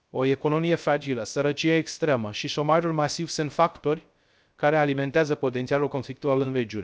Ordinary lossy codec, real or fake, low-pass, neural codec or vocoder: none; fake; none; codec, 16 kHz, 0.3 kbps, FocalCodec